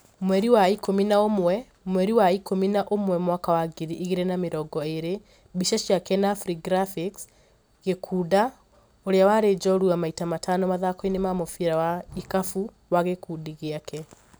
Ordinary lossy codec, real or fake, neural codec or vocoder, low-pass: none; real; none; none